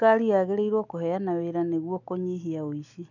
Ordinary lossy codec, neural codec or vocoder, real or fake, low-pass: none; none; real; 7.2 kHz